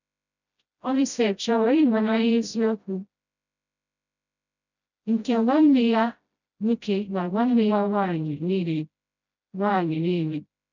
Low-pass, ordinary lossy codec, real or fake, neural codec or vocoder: 7.2 kHz; none; fake; codec, 16 kHz, 0.5 kbps, FreqCodec, smaller model